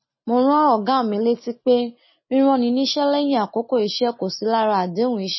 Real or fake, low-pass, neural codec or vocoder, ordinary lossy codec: real; 7.2 kHz; none; MP3, 24 kbps